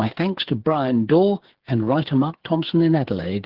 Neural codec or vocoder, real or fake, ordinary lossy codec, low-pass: codec, 16 kHz, 8 kbps, FreqCodec, smaller model; fake; Opus, 16 kbps; 5.4 kHz